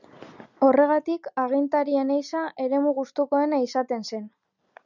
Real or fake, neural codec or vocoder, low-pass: real; none; 7.2 kHz